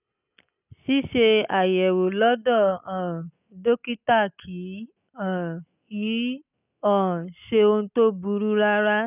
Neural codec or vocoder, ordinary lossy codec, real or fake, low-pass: none; none; real; 3.6 kHz